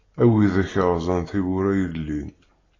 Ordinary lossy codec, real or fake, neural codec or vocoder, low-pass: AAC, 32 kbps; real; none; 7.2 kHz